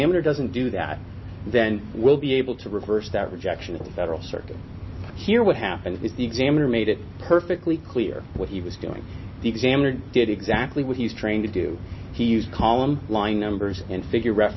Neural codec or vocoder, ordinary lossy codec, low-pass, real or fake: none; MP3, 24 kbps; 7.2 kHz; real